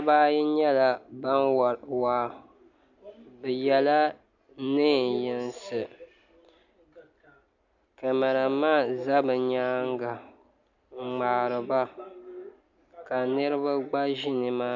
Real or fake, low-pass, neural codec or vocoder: real; 7.2 kHz; none